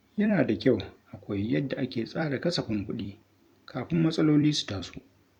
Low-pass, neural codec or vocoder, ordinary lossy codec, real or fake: 19.8 kHz; vocoder, 44.1 kHz, 128 mel bands every 256 samples, BigVGAN v2; none; fake